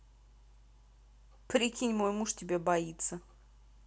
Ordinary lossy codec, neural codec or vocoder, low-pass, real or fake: none; none; none; real